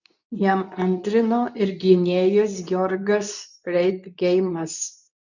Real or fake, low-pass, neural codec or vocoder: fake; 7.2 kHz; codec, 24 kHz, 0.9 kbps, WavTokenizer, medium speech release version 2